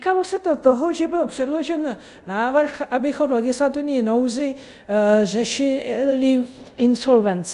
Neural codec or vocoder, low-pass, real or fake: codec, 24 kHz, 0.5 kbps, DualCodec; 10.8 kHz; fake